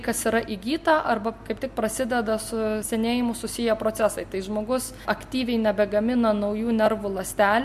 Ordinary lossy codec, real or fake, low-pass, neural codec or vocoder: MP3, 64 kbps; real; 14.4 kHz; none